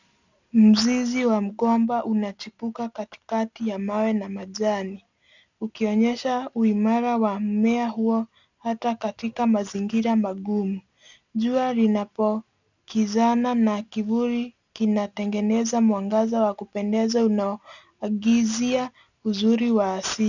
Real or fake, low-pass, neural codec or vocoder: real; 7.2 kHz; none